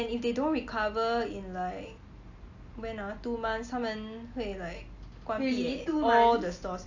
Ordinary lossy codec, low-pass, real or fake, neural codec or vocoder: AAC, 48 kbps; 7.2 kHz; real; none